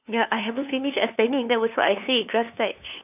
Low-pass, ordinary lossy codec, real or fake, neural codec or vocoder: 3.6 kHz; none; fake; codec, 16 kHz, 2 kbps, FunCodec, trained on LibriTTS, 25 frames a second